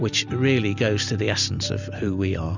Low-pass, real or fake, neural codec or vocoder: 7.2 kHz; real; none